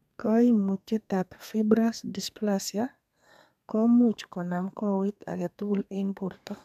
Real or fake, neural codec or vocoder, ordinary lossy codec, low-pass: fake; codec, 32 kHz, 1.9 kbps, SNAC; none; 14.4 kHz